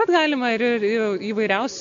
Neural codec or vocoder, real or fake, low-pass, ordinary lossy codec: none; real; 7.2 kHz; MP3, 96 kbps